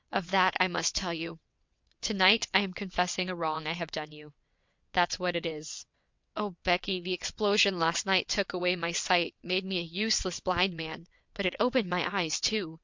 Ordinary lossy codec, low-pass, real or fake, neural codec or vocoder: MP3, 64 kbps; 7.2 kHz; fake; vocoder, 22.05 kHz, 80 mel bands, WaveNeXt